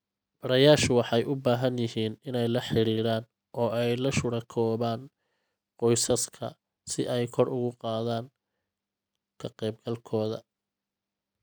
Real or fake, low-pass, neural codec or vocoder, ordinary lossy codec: real; none; none; none